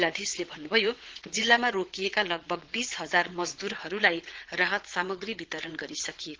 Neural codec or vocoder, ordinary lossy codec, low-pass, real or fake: vocoder, 22.05 kHz, 80 mel bands, WaveNeXt; Opus, 16 kbps; 7.2 kHz; fake